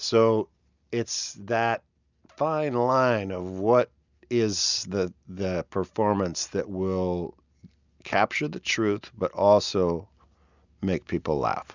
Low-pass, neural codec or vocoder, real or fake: 7.2 kHz; none; real